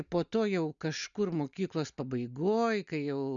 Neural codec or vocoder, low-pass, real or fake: none; 7.2 kHz; real